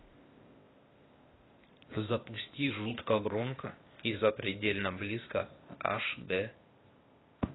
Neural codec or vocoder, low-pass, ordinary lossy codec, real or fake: codec, 16 kHz, 0.8 kbps, ZipCodec; 7.2 kHz; AAC, 16 kbps; fake